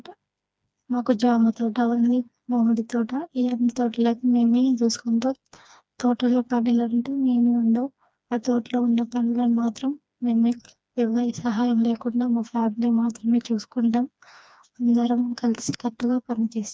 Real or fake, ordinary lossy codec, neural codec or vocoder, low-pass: fake; none; codec, 16 kHz, 2 kbps, FreqCodec, smaller model; none